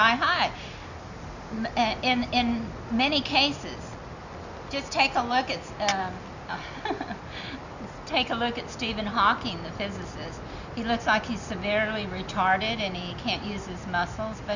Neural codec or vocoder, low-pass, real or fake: none; 7.2 kHz; real